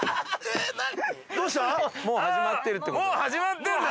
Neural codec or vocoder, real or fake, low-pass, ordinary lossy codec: none; real; none; none